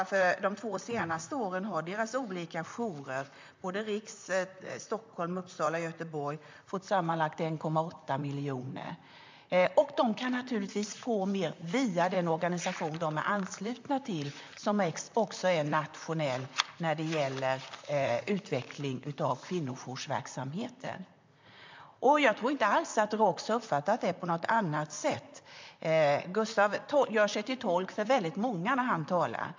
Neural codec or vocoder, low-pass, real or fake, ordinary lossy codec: vocoder, 44.1 kHz, 128 mel bands, Pupu-Vocoder; 7.2 kHz; fake; MP3, 64 kbps